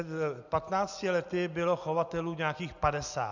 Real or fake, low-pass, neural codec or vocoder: real; 7.2 kHz; none